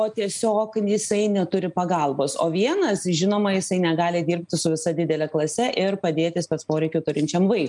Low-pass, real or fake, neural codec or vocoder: 10.8 kHz; real; none